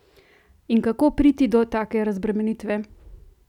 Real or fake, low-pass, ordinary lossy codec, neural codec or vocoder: real; 19.8 kHz; none; none